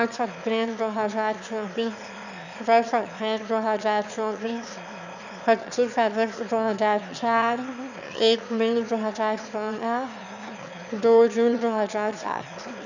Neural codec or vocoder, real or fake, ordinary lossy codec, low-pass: autoencoder, 22.05 kHz, a latent of 192 numbers a frame, VITS, trained on one speaker; fake; none; 7.2 kHz